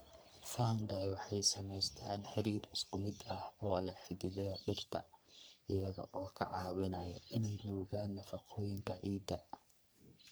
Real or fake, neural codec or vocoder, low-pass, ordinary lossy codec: fake; codec, 44.1 kHz, 3.4 kbps, Pupu-Codec; none; none